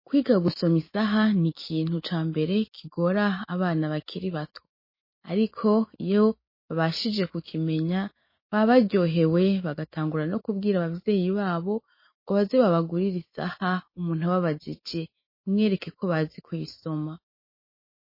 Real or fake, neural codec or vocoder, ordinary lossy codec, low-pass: fake; autoencoder, 48 kHz, 128 numbers a frame, DAC-VAE, trained on Japanese speech; MP3, 24 kbps; 5.4 kHz